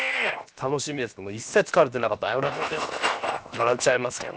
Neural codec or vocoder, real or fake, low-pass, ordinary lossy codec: codec, 16 kHz, 0.7 kbps, FocalCodec; fake; none; none